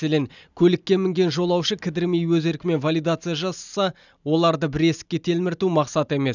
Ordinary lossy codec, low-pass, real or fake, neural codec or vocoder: none; 7.2 kHz; real; none